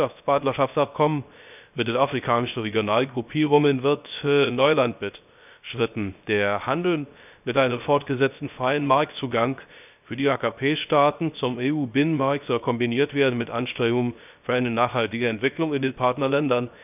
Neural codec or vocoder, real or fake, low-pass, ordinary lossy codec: codec, 16 kHz, 0.3 kbps, FocalCodec; fake; 3.6 kHz; none